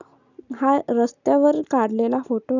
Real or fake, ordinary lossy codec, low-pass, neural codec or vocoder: real; none; 7.2 kHz; none